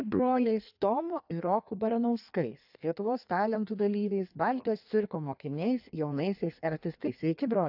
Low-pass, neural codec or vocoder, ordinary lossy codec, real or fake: 5.4 kHz; codec, 16 kHz in and 24 kHz out, 1.1 kbps, FireRedTTS-2 codec; AAC, 48 kbps; fake